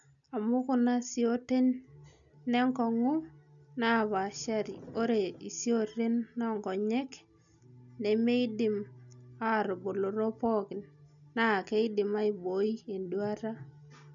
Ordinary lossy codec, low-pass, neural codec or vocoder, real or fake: none; 7.2 kHz; none; real